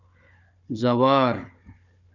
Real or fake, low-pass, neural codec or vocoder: fake; 7.2 kHz; codec, 16 kHz, 4 kbps, FunCodec, trained on Chinese and English, 50 frames a second